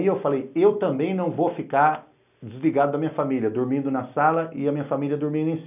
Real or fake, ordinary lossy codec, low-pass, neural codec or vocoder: real; none; 3.6 kHz; none